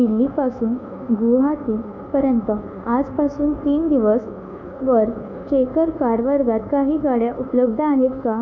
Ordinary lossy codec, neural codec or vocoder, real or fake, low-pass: none; codec, 24 kHz, 1.2 kbps, DualCodec; fake; 7.2 kHz